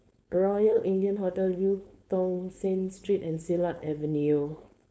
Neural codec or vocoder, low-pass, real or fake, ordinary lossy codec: codec, 16 kHz, 4.8 kbps, FACodec; none; fake; none